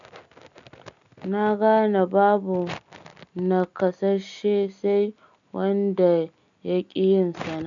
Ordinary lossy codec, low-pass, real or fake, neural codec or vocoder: none; 7.2 kHz; real; none